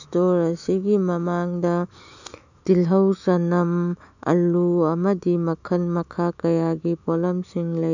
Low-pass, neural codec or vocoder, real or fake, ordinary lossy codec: 7.2 kHz; autoencoder, 48 kHz, 128 numbers a frame, DAC-VAE, trained on Japanese speech; fake; none